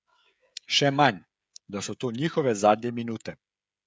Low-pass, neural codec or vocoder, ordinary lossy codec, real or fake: none; codec, 16 kHz, 16 kbps, FreqCodec, smaller model; none; fake